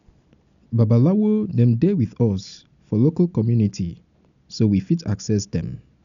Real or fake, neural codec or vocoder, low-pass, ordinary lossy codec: real; none; 7.2 kHz; none